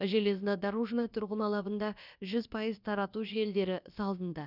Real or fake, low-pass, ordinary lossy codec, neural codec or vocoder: fake; 5.4 kHz; none; codec, 16 kHz, about 1 kbps, DyCAST, with the encoder's durations